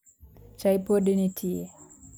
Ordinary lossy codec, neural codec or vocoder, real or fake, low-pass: none; none; real; none